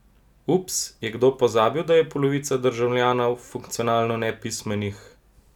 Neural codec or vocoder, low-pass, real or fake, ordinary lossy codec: none; 19.8 kHz; real; none